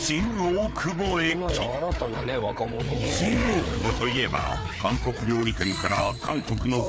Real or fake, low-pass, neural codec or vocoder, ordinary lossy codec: fake; none; codec, 16 kHz, 4 kbps, FreqCodec, larger model; none